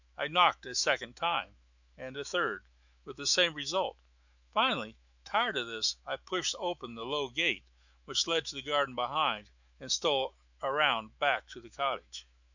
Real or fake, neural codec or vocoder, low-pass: fake; autoencoder, 48 kHz, 128 numbers a frame, DAC-VAE, trained on Japanese speech; 7.2 kHz